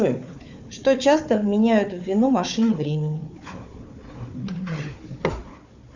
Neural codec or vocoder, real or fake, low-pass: codec, 16 kHz, 4 kbps, FunCodec, trained on Chinese and English, 50 frames a second; fake; 7.2 kHz